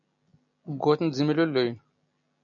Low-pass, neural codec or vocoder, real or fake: 7.2 kHz; none; real